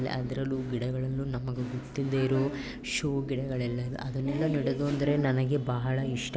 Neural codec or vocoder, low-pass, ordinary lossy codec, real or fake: none; none; none; real